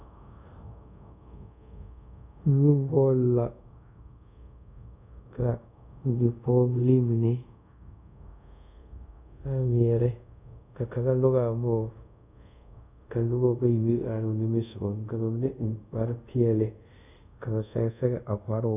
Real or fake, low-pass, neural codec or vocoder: fake; 3.6 kHz; codec, 24 kHz, 0.5 kbps, DualCodec